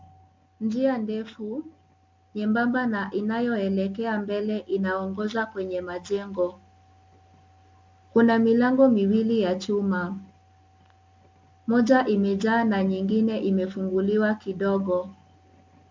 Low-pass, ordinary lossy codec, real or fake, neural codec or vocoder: 7.2 kHz; MP3, 64 kbps; real; none